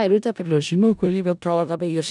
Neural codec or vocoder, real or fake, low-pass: codec, 16 kHz in and 24 kHz out, 0.4 kbps, LongCat-Audio-Codec, four codebook decoder; fake; 10.8 kHz